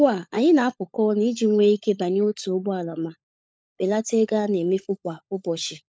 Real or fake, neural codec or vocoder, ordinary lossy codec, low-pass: fake; codec, 16 kHz, 16 kbps, FunCodec, trained on LibriTTS, 50 frames a second; none; none